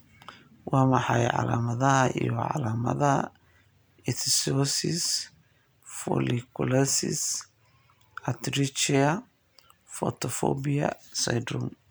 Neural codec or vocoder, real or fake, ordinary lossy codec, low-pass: none; real; none; none